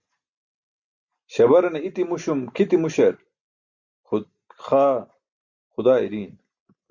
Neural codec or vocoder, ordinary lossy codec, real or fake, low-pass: none; Opus, 64 kbps; real; 7.2 kHz